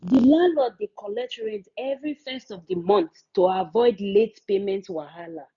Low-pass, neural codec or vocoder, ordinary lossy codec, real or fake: 7.2 kHz; none; none; real